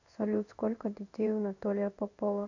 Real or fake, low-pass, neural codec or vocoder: fake; 7.2 kHz; codec, 16 kHz in and 24 kHz out, 1 kbps, XY-Tokenizer